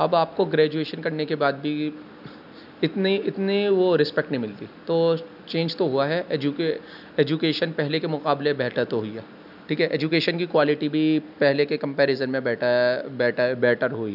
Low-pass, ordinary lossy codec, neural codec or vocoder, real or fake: 5.4 kHz; none; none; real